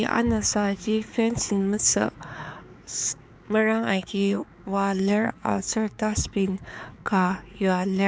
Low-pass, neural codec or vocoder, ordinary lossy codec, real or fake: none; codec, 16 kHz, 4 kbps, X-Codec, HuBERT features, trained on balanced general audio; none; fake